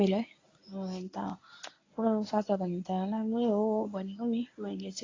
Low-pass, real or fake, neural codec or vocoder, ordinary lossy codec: 7.2 kHz; fake; codec, 24 kHz, 0.9 kbps, WavTokenizer, medium speech release version 1; AAC, 32 kbps